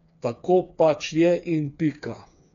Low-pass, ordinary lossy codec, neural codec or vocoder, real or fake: 7.2 kHz; MP3, 64 kbps; codec, 16 kHz, 4 kbps, FreqCodec, smaller model; fake